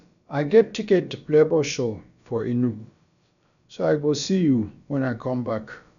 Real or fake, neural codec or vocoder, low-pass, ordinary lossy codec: fake; codec, 16 kHz, about 1 kbps, DyCAST, with the encoder's durations; 7.2 kHz; none